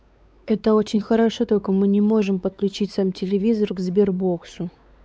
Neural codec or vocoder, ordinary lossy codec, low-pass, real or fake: codec, 16 kHz, 4 kbps, X-Codec, WavLM features, trained on Multilingual LibriSpeech; none; none; fake